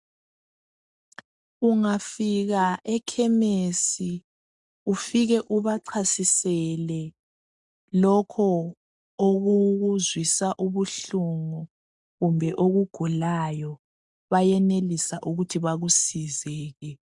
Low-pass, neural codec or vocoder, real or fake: 10.8 kHz; none; real